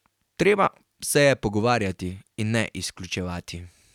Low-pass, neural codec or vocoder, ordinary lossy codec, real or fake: 19.8 kHz; none; none; real